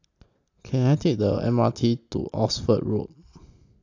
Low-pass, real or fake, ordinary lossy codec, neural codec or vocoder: 7.2 kHz; real; AAC, 48 kbps; none